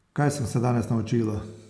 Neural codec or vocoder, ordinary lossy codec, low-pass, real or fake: none; none; none; real